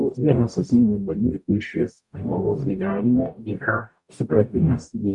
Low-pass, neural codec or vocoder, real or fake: 10.8 kHz; codec, 44.1 kHz, 0.9 kbps, DAC; fake